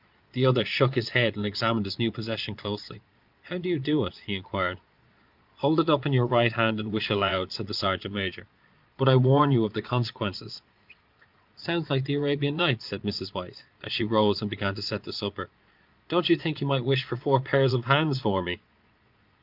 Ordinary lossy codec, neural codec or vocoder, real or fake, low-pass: Opus, 24 kbps; vocoder, 22.05 kHz, 80 mel bands, Vocos; fake; 5.4 kHz